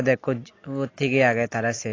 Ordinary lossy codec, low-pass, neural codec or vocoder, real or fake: AAC, 32 kbps; 7.2 kHz; none; real